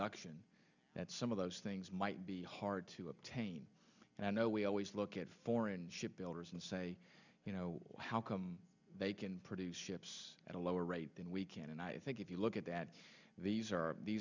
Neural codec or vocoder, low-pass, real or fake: none; 7.2 kHz; real